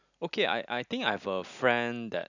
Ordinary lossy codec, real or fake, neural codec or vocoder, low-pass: none; real; none; 7.2 kHz